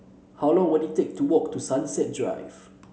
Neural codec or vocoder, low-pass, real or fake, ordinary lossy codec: none; none; real; none